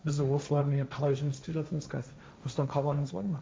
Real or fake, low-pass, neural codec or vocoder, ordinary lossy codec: fake; none; codec, 16 kHz, 1.1 kbps, Voila-Tokenizer; none